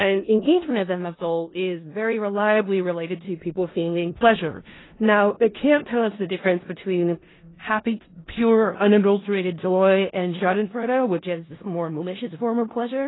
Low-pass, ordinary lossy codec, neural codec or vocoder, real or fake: 7.2 kHz; AAC, 16 kbps; codec, 16 kHz in and 24 kHz out, 0.4 kbps, LongCat-Audio-Codec, four codebook decoder; fake